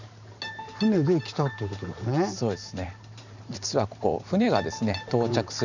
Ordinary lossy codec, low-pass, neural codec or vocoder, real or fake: none; 7.2 kHz; vocoder, 44.1 kHz, 128 mel bands every 512 samples, BigVGAN v2; fake